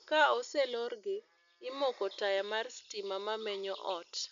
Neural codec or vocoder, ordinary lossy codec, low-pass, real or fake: none; MP3, 64 kbps; 7.2 kHz; real